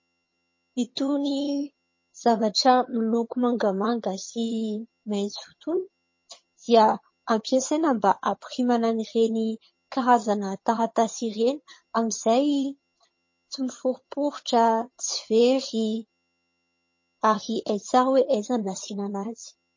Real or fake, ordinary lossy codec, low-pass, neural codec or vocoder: fake; MP3, 32 kbps; 7.2 kHz; vocoder, 22.05 kHz, 80 mel bands, HiFi-GAN